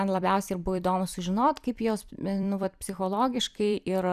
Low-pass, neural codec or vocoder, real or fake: 14.4 kHz; vocoder, 44.1 kHz, 128 mel bands every 256 samples, BigVGAN v2; fake